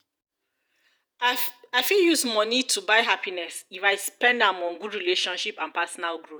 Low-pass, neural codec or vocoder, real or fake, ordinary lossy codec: none; vocoder, 48 kHz, 128 mel bands, Vocos; fake; none